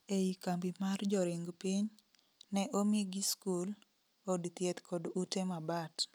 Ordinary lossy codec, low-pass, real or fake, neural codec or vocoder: none; none; real; none